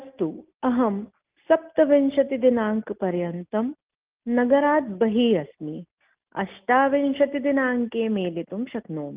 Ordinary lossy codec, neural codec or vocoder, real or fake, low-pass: Opus, 32 kbps; none; real; 3.6 kHz